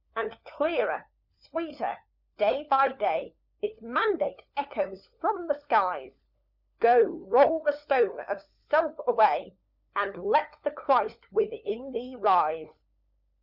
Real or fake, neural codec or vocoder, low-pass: fake; codec, 16 kHz, 4 kbps, FunCodec, trained on LibriTTS, 50 frames a second; 5.4 kHz